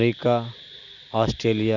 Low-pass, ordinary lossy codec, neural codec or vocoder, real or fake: 7.2 kHz; none; none; real